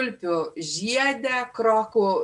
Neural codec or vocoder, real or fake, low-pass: none; real; 10.8 kHz